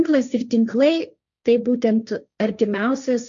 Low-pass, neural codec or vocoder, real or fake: 7.2 kHz; codec, 16 kHz, 1.1 kbps, Voila-Tokenizer; fake